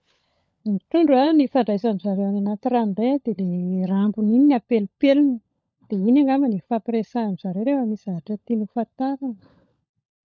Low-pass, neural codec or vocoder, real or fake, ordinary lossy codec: none; codec, 16 kHz, 16 kbps, FunCodec, trained on LibriTTS, 50 frames a second; fake; none